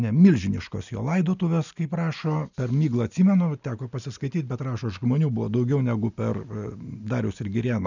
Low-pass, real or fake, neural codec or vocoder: 7.2 kHz; real; none